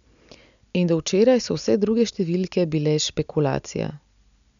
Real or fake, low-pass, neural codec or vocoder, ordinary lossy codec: real; 7.2 kHz; none; none